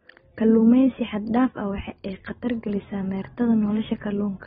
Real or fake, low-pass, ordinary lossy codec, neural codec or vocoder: real; 19.8 kHz; AAC, 16 kbps; none